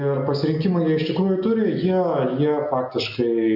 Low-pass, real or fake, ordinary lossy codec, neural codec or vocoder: 5.4 kHz; real; AAC, 48 kbps; none